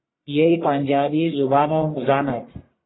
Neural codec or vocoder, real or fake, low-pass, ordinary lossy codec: codec, 44.1 kHz, 1.7 kbps, Pupu-Codec; fake; 7.2 kHz; AAC, 16 kbps